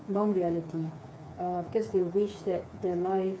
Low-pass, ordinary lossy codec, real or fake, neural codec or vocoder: none; none; fake; codec, 16 kHz, 4 kbps, FreqCodec, smaller model